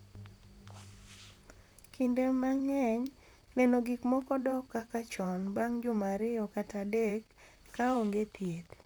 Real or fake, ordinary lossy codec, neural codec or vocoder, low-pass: fake; none; vocoder, 44.1 kHz, 128 mel bands, Pupu-Vocoder; none